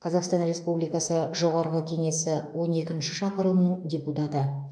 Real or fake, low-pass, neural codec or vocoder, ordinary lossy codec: fake; 9.9 kHz; autoencoder, 48 kHz, 32 numbers a frame, DAC-VAE, trained on Japanese speech; none